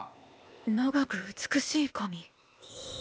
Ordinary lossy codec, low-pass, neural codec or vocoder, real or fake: none; none; codec, 16 kHz, 0.8 kbps, ZipCodec; fake